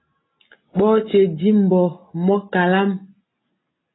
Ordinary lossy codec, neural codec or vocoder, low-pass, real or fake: AAC, 16 kbps; none; 7.2 kHz; real